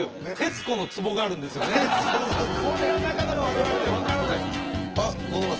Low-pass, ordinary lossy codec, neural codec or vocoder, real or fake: 7.2 kHz; Opus, 16 kbps; vocoder, 24 kHz, 100 mel bands, Vocos; fake